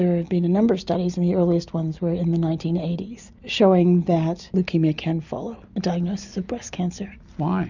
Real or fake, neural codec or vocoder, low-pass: real; none; 7.2 kHz